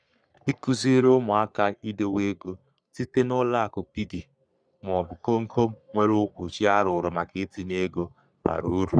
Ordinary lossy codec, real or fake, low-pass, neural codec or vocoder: none; fake; 9.9 kHz; codec, 44.1 kHz, 3.4 kbps, Pupu-Codec